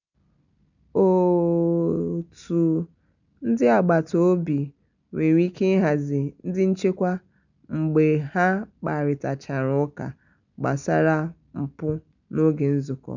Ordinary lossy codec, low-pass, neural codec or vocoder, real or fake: none; 7.2 kHz; none; real